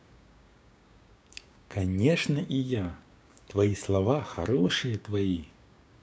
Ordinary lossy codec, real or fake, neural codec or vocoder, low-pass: none; fake; codec, 16 kHz, 6 kbps, DAC; none